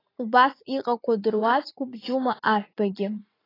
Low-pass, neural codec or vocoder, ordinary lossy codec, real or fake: 5.4 kHz; vocoder, 44.1 kHz, 80 mel bands, Vocos; AAC, 24 kbps; fake